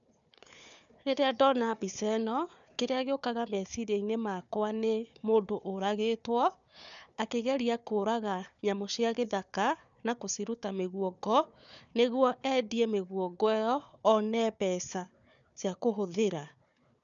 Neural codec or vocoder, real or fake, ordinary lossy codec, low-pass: codec, 16 kHz, 4 kbps, FunCodec, trained on Chinese and English, 50 frames a second; fake; none; 7.2 kHz